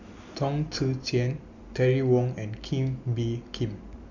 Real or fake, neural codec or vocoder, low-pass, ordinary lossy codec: real; none; 7.2 kHz; none